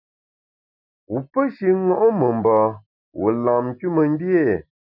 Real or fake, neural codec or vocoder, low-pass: real; none; 5.4 kHz